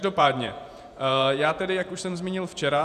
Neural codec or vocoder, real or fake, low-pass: vocoder, 48 kHz, 128 mel bands, Vocos; fake; 14.4 kHz